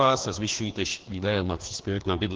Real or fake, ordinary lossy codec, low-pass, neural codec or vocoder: fake; Opus, 16 kbps; 7.2 kHz; codec, 16 kHz, 2 kbps, FreqCodec, larger model